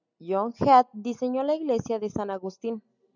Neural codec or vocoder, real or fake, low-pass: none; real; 7.2 kHz